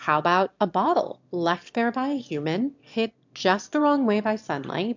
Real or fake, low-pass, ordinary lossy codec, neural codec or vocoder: fake; 7.2 kHz; MP3, 64 kbps; autoencoder, 22.05 kHz, a latent of 192 numbers a frame, VITS, trained on one speaker